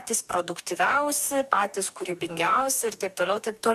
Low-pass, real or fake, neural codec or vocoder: 14.4 kHz; fake; codec, 44.1 kHz, 2.6 kbps, DAC